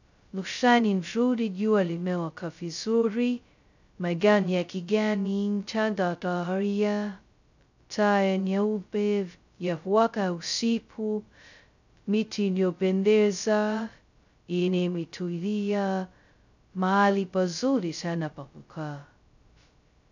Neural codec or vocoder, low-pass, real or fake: codec, 16 kHz, 0.2 kbps, FocalCodec; 7.2 kHz; fake